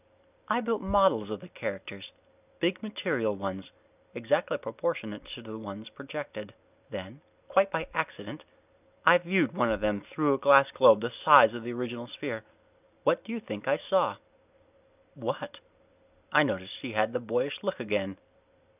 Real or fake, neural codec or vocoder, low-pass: real; none; 3.6 kHz